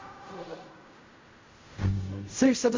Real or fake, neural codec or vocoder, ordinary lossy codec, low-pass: fake; codec, 16 kHz in and 24 kHz out, 0.4 kbps, LongCat-Audio-Codec, fine tuned four codebook decoder; MP3, 48 kbps; 7.2 kHz